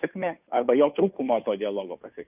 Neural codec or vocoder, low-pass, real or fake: codec, 16 kHz, 2 kbps, FunCodec, trained on Chinese and English, 25 frames a second; 3.6 kHz; fake